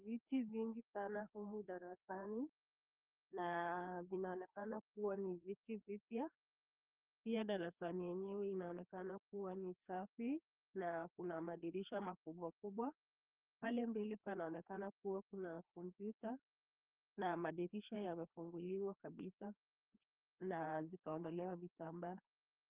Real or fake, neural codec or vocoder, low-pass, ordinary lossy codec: fake; codec, 16 kHz, 2 kbps, FreqCodec, larger model; 3.6 kHz; Opus, 24 kbps